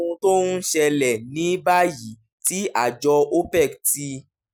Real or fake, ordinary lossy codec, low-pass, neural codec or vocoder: real; none; none; none